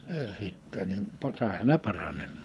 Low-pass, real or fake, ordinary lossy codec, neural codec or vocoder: none; fake; none; codec, 24 kHz, 6 kbps, HILCodec